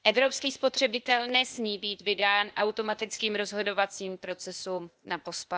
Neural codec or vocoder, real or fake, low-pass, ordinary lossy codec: codec, 16 kHz, 0.8 kbps, ZipCodec; fake; none; none